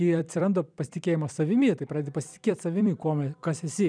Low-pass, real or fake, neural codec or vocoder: 9.9 kHz; fake; vocoder, 44.1 kHz, 128 mel bands every 256 samples, BigVGAN v2